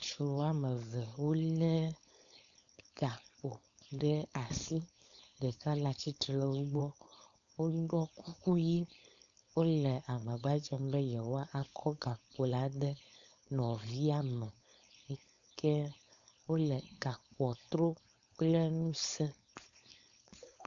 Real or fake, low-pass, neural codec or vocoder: fake; 7.2 kHz; codec, 16 kHz, 4.8 kbps, FACodec